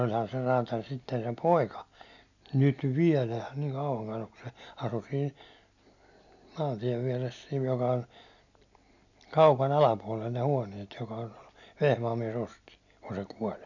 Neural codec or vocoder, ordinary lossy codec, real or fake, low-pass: none; none; real; 7.2 kHz